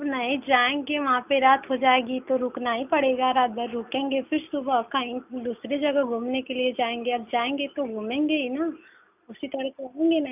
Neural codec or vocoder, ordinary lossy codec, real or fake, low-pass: none; none; real; 3.6 kHz